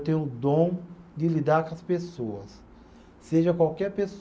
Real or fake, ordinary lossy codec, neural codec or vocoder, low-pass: real; none; none; none